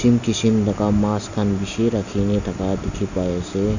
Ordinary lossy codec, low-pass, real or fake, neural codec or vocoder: none; 7.2 kHz; real; none